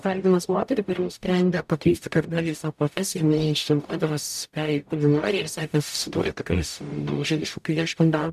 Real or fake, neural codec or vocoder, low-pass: fake; codec, 44.1 kHz, 0.9 kbps, DAC; 14.4 kHz